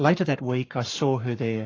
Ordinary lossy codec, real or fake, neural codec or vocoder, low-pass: AAC, 32 kbps; fake; codec, 44.1 kHz, 7.8 kbps, Pupu-Codec; 7.2 kHz